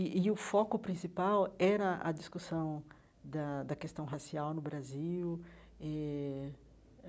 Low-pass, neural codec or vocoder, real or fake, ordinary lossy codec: none; none; real; none